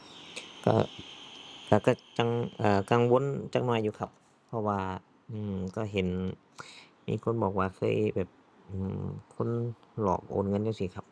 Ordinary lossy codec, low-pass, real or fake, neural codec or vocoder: none; none; real; none